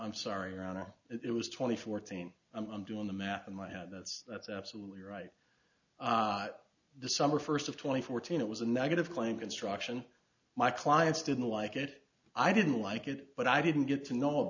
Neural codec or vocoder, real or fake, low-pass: none; real; 7.2 kHz